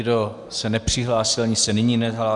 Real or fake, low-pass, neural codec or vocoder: real; 10.8 kHz; none